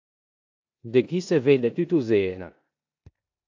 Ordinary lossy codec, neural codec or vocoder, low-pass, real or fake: AAC, 48 kbps; codec, 16 kHz in and 24 kHz out, 0.9 kbps, LongCat-Audio-Codec, four codebook decoder; 7.2 kHz; fake